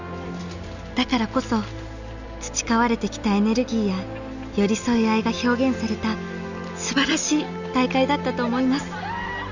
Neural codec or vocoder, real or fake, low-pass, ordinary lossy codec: none; real; 7.2 kHz; none